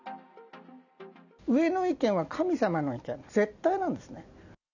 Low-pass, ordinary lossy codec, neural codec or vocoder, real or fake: 7.2 kHz; none; none; real